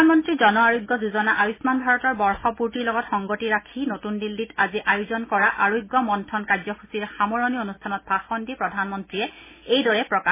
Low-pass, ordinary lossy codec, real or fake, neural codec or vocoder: 3.6 kHz; MP3, 16 kbps; real; none